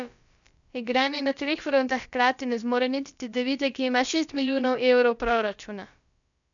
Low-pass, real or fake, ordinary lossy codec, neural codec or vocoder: 7.2 kHz; fake; none; codec, 16 kHz, about 1 kbps, DyCAST, with the encoder's durations